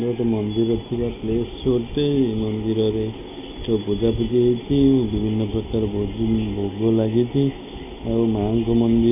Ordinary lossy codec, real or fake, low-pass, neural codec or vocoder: none; real; 3.6 kHz; none